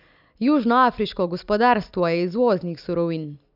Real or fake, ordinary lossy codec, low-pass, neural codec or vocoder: real; none; 5.4 kHz; none